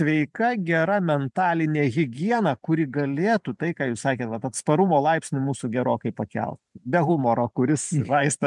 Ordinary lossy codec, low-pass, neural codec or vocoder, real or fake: MP3, 96 kbps; 10.8 kHz; vocoder, 44.1 kHz, 128 mel bands every 512 samples, BigVGAN v2; fake